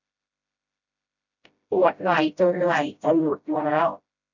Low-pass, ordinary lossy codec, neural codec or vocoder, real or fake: 7.2 kHz; none; codec, 16 kHz, 0.5 kbps, FreqCodec, smaller model; fake